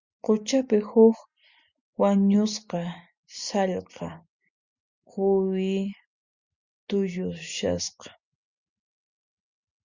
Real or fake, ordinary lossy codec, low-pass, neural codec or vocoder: real; Opus, 64 kbps; 7.2 kHz; none